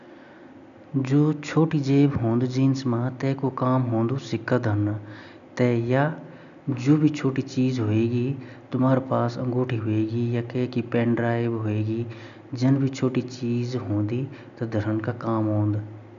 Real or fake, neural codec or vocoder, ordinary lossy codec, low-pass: real; none; none; 7.2 kHz